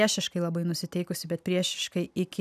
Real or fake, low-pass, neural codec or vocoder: real; 14.4 kHz; none